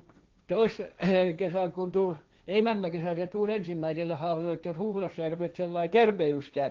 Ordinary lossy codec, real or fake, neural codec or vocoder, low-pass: Opus, 24 kbps; fake; codec, 16 kHz, 1.1 kbps, Voila-Tokenizer; 7.2 kHz